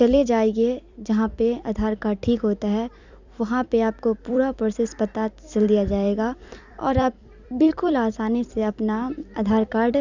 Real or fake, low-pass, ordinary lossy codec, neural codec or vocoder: fake; 7.2 kHz; Opus, 64 kbps; vocoder, 44.1 kHz, 128 mel bands every 256 samples, BigVGAN v2